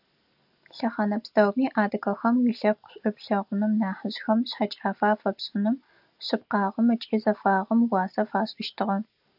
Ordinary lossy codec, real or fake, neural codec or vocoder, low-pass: AAC, 48 kbps; real; none; 5.4 kHz